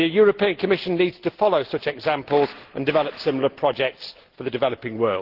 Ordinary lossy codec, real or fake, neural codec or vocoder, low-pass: Opus, 16 kbps; real; none; 5.4 kHz